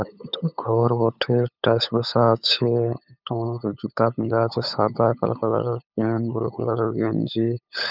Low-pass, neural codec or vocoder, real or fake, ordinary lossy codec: 5.4 kHz; codec, 16 kHz, 8 kbps, FunCodec, trained on LibriTTS, 25 frames a second; fake; none